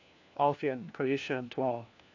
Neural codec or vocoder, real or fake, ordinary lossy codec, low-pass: codec, 16 kHz, 1 kbps, FunCodec, trained on LibriTTS, 50 frames a second; fake; AAC, 48 kbps; 7.2 kHz